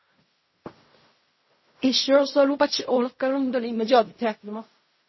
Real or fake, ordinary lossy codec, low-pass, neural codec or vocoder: fake; MP3, 24 kbps; 7.2 kHz; codec, 16 kHz in and 24 kHz out, 0.4 kbps, LongCat-Audio-Codec, fine tuned four codebook decoder